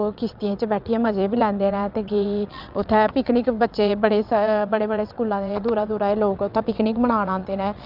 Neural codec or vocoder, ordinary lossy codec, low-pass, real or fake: vocoder, 22.05 kHz, 80 mel bands, WaveNeXt; none; 5.4 kHz; fake